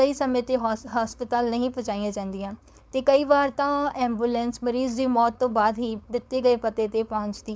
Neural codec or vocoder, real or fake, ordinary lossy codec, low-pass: codec, 16 kHz, 4.8 kbps, FACodec; fake; none; none